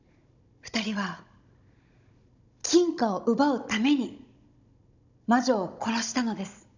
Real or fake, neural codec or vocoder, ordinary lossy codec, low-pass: fake; codec, 16 kHz, 16 kbps, FunCodec, trained on Chinese and English, 50 frames a second; none; 7.2 kHz